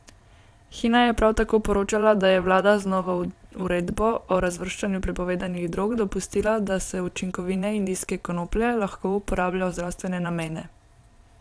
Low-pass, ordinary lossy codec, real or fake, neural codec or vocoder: none; none; fake; vocoder, 22.05 kHz, 80 mel bands, Vocos